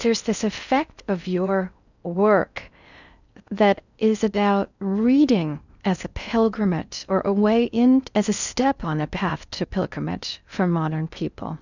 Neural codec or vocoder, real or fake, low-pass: codec, 16 kHz in and 24 kHz out, 0.6 kbps, FocalCodec, streaming, 4096 codes; fake; 7.2 kHz